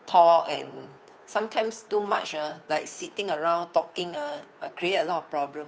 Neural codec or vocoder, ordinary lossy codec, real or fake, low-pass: codec, 16 kHz, 2 kbps, FunCodec, trained on Chinese and English, 25 frames a second; none; fake; none